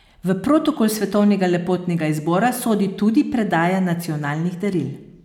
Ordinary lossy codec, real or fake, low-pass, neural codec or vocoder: none; real; 19.8 kHz; none